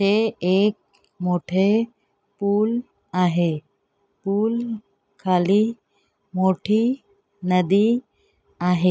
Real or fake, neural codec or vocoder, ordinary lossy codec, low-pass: real; none; none; none